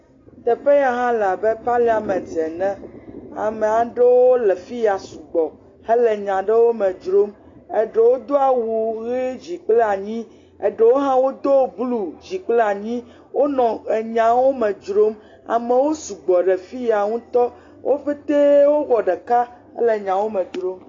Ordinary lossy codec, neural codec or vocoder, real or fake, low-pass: AAC, 32 kbps; none; real; 7.2 kHz